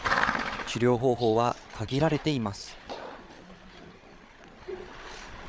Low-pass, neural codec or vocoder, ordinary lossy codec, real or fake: none; codec, 16 kHz, 8 kbps, FreqCodec, larger model; none; fake